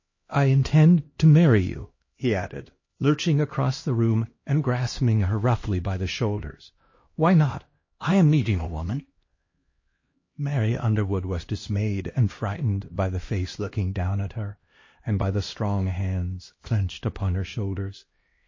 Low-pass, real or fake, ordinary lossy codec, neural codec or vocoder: 7.2 kHz; fake; MP3, 32 kbps; codec, 16 kHz, 1 kbps, X-Codec, HuBERT features, trained on LibriSpeech